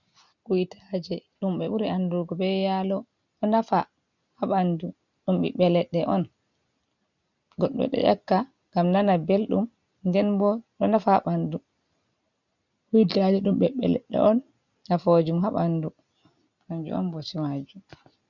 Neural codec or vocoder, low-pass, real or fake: none; 7.2 kHz; real